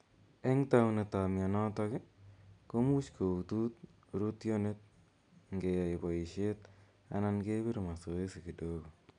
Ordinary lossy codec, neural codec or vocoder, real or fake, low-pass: none; none; real; 9.9 kHz